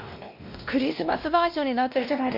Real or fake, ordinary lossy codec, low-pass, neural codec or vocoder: fake; MP3, 48 kbps; 5.4 kHz; codec, 16 kHz, 1 kbps, X-Codec, WavLM features, trained on Multilingual LibriSpeech